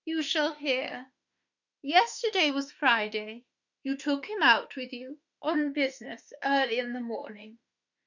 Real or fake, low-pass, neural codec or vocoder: fake; 7.2 kHz; autoencoder, 48 kHz, 32 numbers a frame, DAC-VAE, trained on Japanese speech